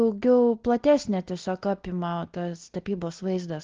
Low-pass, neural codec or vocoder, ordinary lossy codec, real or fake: 7.2 kHz; none; Opus, 16 kbps; real